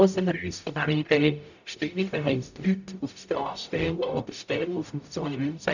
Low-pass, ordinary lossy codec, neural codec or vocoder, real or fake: 7.2 kHz; none; codec, 44.1 kHz, 0.9 kbps, DAC; fake